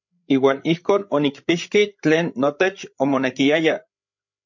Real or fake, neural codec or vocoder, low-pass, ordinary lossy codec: fake; codec, 16 kHz, 8 kbps, FreqCodec, larger model; 7.2 kHz; MP3, 48 kbps